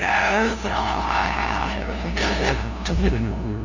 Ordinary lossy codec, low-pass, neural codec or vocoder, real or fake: none; 7.2 kHz; codec, 16 kHz, 0.5 kbps, FunCodec, trained on LibriTTS, 25 frames a second; fake